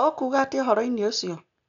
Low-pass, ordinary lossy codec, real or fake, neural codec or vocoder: 7.2 kHz; none; real; none